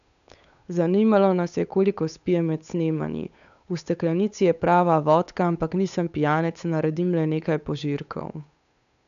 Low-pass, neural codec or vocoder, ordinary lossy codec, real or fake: 7.2 kHz; codec, 16 kHz, 8 kbps, FunCodec, trained on Chinese and English, 25 frames a second; none; fake